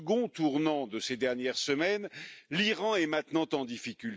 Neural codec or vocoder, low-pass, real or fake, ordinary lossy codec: none; none; real; none